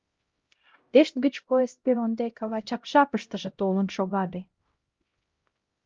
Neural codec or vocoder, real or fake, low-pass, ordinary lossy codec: codec, 16 kHz, 0.5 kbps, X-Codec, HuBERT features, trained on LibriSpeech; fake; 7.2 kHz; Opus, 32 kbps